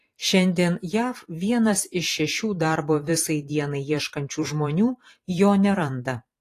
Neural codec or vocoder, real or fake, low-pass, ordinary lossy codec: vocoder, 44.1 kHz, 128 mel bands every 512 samples, BigVGAN v2; fake; 14.4 kHz; AAC, 48 kbps